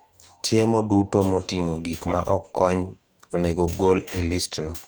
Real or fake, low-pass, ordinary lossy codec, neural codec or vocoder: fake; none; none; codec, 44.1 kHz, 2.6 kbps, DAC